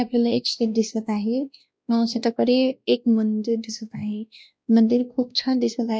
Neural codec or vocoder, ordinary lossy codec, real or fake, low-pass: codec, 16 kHz, 1 kbps, X-Codec, WavLM features, trained on Multilingual LibriSpeech; none; fake; none